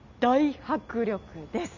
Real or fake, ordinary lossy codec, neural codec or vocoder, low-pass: real; none; none; 7.2 kHz